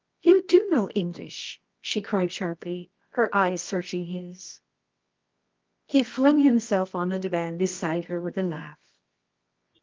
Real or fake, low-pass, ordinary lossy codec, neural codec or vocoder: fake; 7.2 kHz; Opus, 32 kbps; codec, 24 kHz, 0.9 kbps, WavTokenizer, medium music audio release